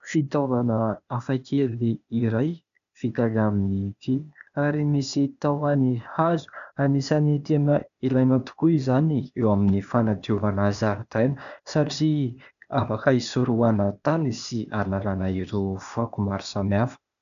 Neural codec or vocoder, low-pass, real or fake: codec, 16 kHz, 0.8 kbps, ZipCodec; 7.2 kHz; fake